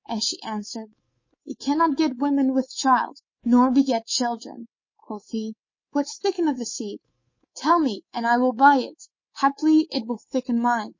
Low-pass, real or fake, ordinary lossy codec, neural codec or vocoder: 7.2 kHz; fake; MP3, 32 kbps; codec, 24 kHz, 3.1 kbps, DualCodec